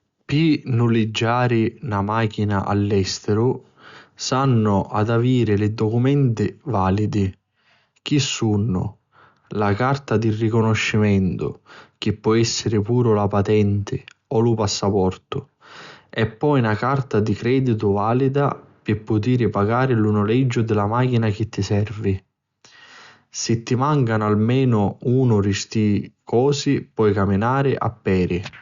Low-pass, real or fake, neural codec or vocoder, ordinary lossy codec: 7.2 kHz; real; none; Opus, 64 kbps